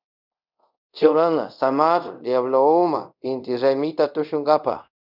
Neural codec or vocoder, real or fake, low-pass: codec, 24 kHz, 0.5 kbps, DualCodec; fake; 5.4 kHz